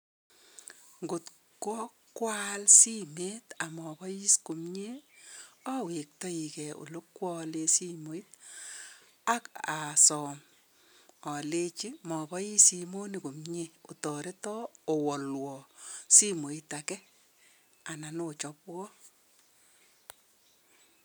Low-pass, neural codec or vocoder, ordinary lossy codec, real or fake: none; none; none; real